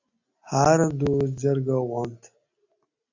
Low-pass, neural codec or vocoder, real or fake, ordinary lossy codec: 7.2 kHz; none; real; AAC, 48 kbps